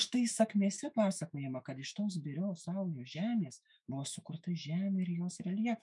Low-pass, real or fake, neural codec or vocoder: 10.8 kHz; fake; autoencoder, 48 kHz, 128 numbers a frame, DAC-VAE, trained on Japanese speech